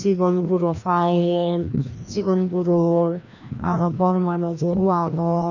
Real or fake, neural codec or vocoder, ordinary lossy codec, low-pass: fake; codec, 16 kHz, 1 kbps, FreqCodec, larger model; none; 7.2 kHz